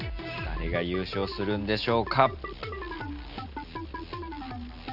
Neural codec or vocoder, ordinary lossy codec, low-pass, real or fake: none; none; 5.4 kHz; real